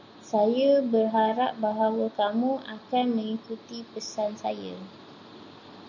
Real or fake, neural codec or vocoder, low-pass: real; none; 7.2 kHz